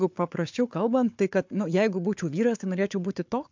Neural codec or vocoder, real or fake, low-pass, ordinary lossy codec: codec, 16 kHz, 4 kbps, FunCodec, trained on Chinese and English, 50 frames a second; fake; 7.2 kHz; MP3, 64 kbps